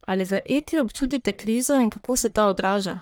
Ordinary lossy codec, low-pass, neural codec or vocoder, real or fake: none; none; codec, 44.1 kHz, 1.7 kbps, Pupu-Codec; fake